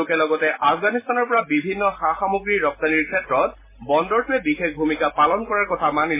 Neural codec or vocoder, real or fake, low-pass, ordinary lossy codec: none; real; 3.6 kHz; MP3, 16 kbps